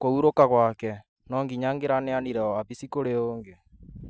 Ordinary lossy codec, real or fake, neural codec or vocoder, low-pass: none; real; none; none